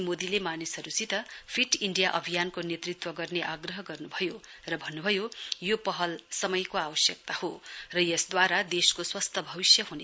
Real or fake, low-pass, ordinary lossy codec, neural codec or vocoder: real; none; none; none